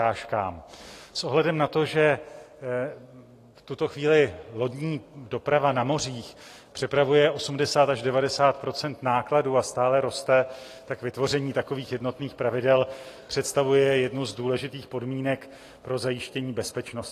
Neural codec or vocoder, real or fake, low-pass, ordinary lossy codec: none; real; 14.4 kHz; AAC, 48 kbps